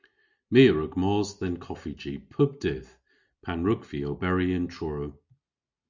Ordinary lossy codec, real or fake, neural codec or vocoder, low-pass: Opus, 64 kbps; real; none; 7.2 kHz